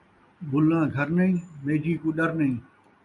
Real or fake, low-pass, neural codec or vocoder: real; 10.8 kHz; none